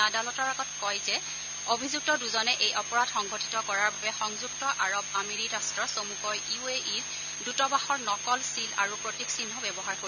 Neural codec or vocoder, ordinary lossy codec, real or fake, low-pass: none; none; real; 7.2 kHz